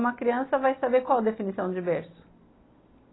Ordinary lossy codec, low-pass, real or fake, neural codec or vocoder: AAC, 16 kbps; 7.2 kHz; real; none